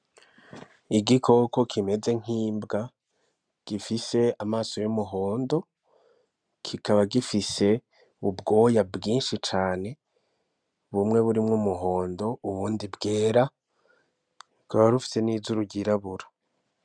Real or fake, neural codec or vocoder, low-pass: real; none; 9.9 kHz